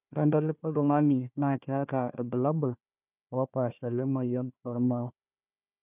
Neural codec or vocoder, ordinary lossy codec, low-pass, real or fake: codec, 16 kHz, 1 kbps, FunCodec, trained on Chinese and English, 50 frames a second; none; 3.6 kHz; fake